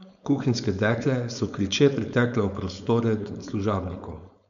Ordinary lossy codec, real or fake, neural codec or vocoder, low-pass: none; fake; codec, 16 kHz, 4.8 kbps, FACodec; 7.2 kHz